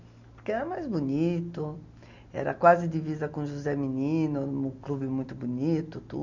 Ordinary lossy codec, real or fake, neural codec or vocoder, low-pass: none; real; none; 7.2 kHz